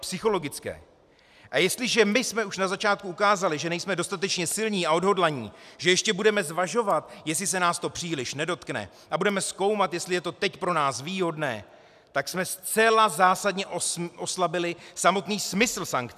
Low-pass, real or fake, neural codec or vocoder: 14.4 kHz; real; none